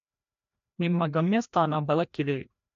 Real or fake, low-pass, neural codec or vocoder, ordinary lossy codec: fake; 7.2 kHz; codec, 16 kHz, 1 kbps, FreqCodec, larger model; AAC, 64 kbps